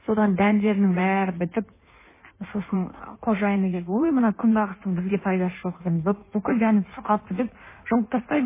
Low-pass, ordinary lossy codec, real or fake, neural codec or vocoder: 3.6 kHz; MP3, 16 kbps; fake; codec, 16 kHz in and 24 kHz out, 1.1 kbps, FireRedTTS-2 codec